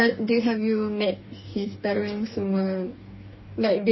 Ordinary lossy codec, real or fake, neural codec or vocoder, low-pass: MP3, 24 kbps; fake; codec, 44.1 kHz, 2.6 kbps, DAC; 7.2 kHz